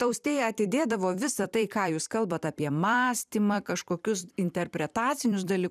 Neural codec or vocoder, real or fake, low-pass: vocoder, 44.1 kHz, 128 mel bands every 256 samples, BigVGAN v2; fake; 14.4 kHz